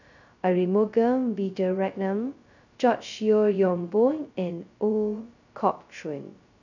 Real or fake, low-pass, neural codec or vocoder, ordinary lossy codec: fake; 7.2 kHz; codec, 16 kHz, 0.2 kbps, FocalCodec; none